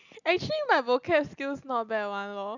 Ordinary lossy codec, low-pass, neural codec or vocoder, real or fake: none; 7.2 kHz; none; real